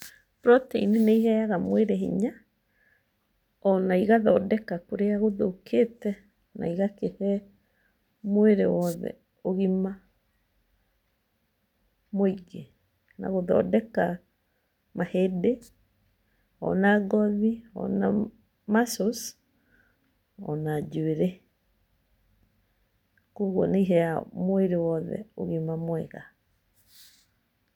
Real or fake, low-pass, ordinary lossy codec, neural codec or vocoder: real; 19.8 kHz; none; none